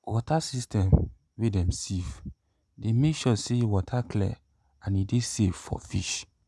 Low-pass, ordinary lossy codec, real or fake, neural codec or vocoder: none; none; real; none